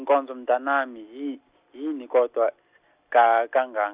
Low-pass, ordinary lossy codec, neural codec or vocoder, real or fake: 3.6 kHz; Opus, 64 kbps; none; real